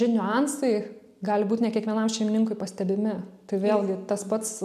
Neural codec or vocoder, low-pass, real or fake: none; 14.4 kHz; real